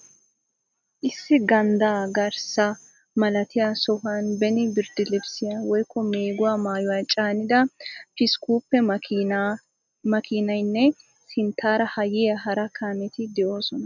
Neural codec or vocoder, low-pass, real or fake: none; 7.2 kHz; real